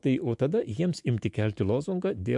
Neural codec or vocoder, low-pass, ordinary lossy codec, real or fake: autoencoder, 48 kHz, 128 numbers a frame, DAC-VAE, trained on Japanese speech; 10.8 kHz; MP3, 64 kbps; fake